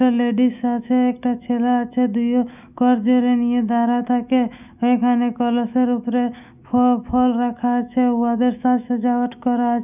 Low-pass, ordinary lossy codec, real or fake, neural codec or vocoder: 3.6 kHz; none; fake; autoencoder, 48 kHz, 128 numbers a frame, DAC-VAE, trained on Japanese speech